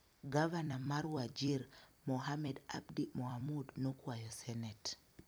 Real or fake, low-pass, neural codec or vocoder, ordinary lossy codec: fake; none; vocoder, 44.1 kHz, 128 mel bands every 256 samples, BigVGAN v2; none